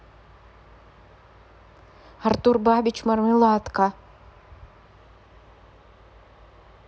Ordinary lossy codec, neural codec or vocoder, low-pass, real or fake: none; none; none; real